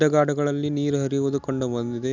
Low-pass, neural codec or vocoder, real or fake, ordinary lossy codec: 7.2 kHz; none; real; none